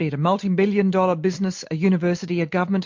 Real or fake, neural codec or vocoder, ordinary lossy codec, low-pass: real; none; MP3, 48 kbps; 7.2 kHz